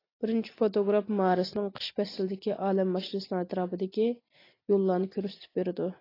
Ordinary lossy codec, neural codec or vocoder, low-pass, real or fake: AAC, 24 kbps; none; 5.4 kHz; real